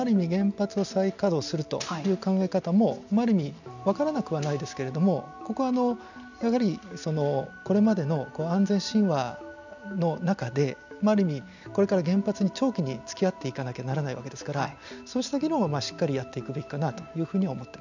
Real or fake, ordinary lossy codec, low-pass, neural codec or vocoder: real; none; 7.2 kHz; none